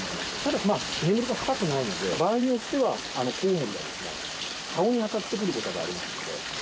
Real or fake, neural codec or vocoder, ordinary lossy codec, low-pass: real; none; none; none